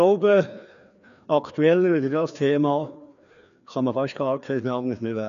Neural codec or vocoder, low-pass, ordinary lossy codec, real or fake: codec, 16 kHz, 2 kbps, FreqCodec, larger model; 7.2 kHz; none; fake